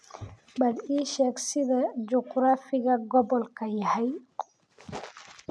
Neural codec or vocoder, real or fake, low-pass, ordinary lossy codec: none; real; none; none